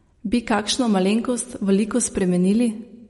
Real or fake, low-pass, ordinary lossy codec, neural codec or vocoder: real; 19.8 kHz; MP3, 48 kbps; none